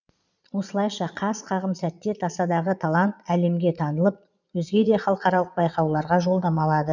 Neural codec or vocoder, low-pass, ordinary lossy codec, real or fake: vocoder, 22.05 kHz, 80 mel bands, Vocos; 7.2 kHz; none; fake